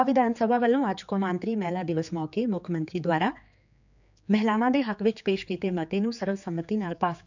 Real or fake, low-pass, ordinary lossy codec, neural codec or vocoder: fake; 7.2 kHz; none; codec, 16 kHz, 4 kbps, X-Codec, HuBERT features, trained on general audio